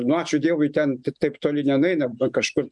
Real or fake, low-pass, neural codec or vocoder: real; 10.8 kHz; none